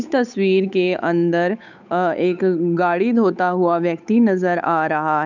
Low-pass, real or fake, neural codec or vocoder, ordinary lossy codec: 7.2 kHz; fake; codec, 16 kHz, 8 kbps, FunCodec, trained on Chinese and English, 25 frames a second; none